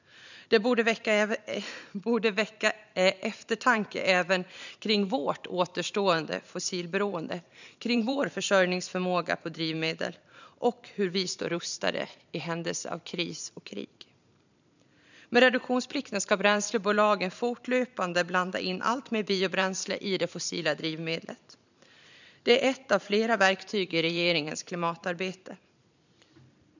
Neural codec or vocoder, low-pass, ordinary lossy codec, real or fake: vocoder, 44.1 kHz, 128 mel bands every 512 samples, BigVGAN v2; 7.2 kHz; none; fake